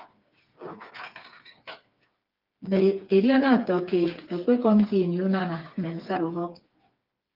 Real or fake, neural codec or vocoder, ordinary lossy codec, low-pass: fake; codec, 16 kHz, 4 kbps, FreqCodec, smaller model; Opus, 24 kbps; 5.4 kHz